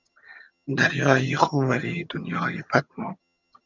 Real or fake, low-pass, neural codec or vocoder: fake; 7.2 kHz; vocoder, 22.05 kHz, 80 mel bands, HiFi-GAN